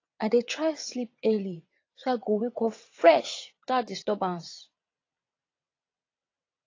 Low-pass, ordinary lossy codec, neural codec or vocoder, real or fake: 7.2 kHz; AAC, 32 kbps; vocoder, 22.05 kHz, 80 mel bands, WaveNeXt; fake